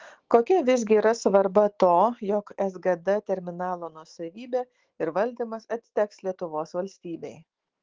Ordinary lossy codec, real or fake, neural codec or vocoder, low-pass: Opus, 16 kbps; fake; autoencoder, 48 kHz, 128 numbers a frame, DAC-VAE, trained on Japanese speech; 7.2 kHz